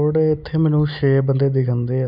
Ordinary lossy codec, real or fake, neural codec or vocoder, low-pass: none; real; none; 5.4 kHz